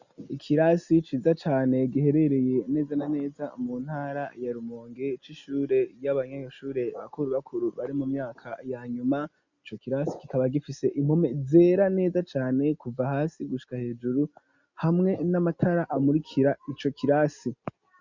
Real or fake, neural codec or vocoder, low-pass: real; none; 7.2 kHz